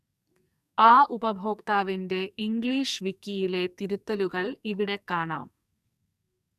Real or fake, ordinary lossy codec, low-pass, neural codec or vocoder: fake; none; 14.4 kHz; codec, 44.1 kHz, 2.6 kbps, SNAC